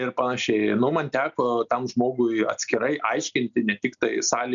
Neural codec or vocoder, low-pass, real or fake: none; 7.2 kHz; real